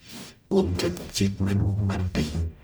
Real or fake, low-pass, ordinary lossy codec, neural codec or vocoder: fake; none; none; codec, 44.1 kHz, 0.9 kbps, DAC